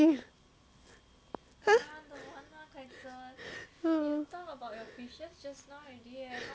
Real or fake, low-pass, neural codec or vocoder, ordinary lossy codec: real; none; none; none